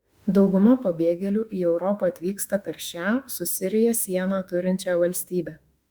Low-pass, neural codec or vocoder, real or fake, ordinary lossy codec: 19.8 kHz; autoencoder, 48 kHz, 32 numbers a frame, DAC-VAE, trained on Japanese speech; fake; Opus, 64 kbps